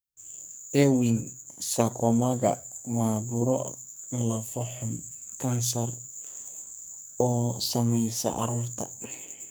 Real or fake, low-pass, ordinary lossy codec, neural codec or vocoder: fake; none; none; codec, 44.1 kHz, 2.6 kbps, SNAC